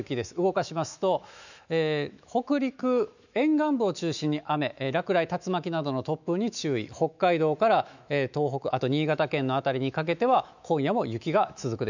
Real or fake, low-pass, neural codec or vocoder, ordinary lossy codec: fake; 7.2 kHz; autoencoder, 48 kHz, 128 numbers a frame, DAC-VAE, trained on Japanese speech; none